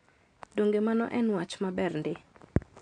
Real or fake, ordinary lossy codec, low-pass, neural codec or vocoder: real; none; 9.9 kHz; none